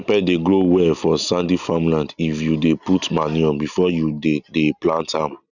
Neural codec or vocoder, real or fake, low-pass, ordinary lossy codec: none; real; 7.2 kHz; none